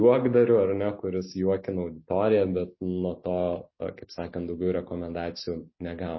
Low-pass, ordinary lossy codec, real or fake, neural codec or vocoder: 7.2 kHz; MP3, 24 kbps; real; none